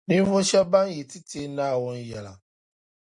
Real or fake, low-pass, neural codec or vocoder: real; 10.8 kHz; none